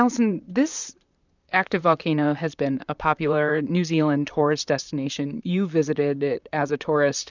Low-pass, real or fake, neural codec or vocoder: 7.2 kHz; fake; vocoder, 44.1 kHz, 128 mel bands, Pupu-Vocoder